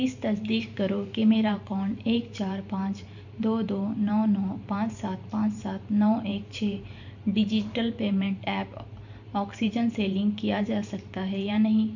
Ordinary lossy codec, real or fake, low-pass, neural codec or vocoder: none; fake; 7.2 kHz; vocoder, 44.1 kHz, 128 mel bands every 512 samples, BigVGAN v2